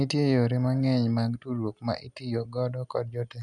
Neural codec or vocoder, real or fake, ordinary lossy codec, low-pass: none; real; none; none